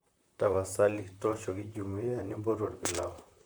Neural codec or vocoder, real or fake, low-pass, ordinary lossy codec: vocoder, 44.1 kHz, 128 mel bands, Pupu-Vocoder; fake; none; none